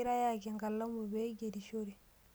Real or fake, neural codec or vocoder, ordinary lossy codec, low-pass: real; none; none; none